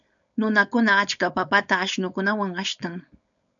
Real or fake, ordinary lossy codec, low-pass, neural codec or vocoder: fake; MP3, 96 kbps; 7.2 kHz; codec, 16 kHz, 4.8 kbps, FACodec